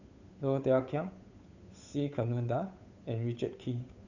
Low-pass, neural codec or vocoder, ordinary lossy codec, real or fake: 7.2 kHz; codec, 16 kHz, 8 kbps, FunCodec, trained on Chinese and English, 25 frames a second; none; fake